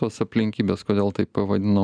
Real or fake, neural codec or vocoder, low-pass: real; none; 9.9 kHz